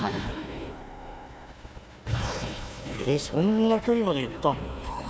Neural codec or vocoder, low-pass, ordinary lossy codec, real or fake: codec, 16 kHz, 1 kbps, FunCodec, trained on Chinese and English, 50 frames a second; none; none; fake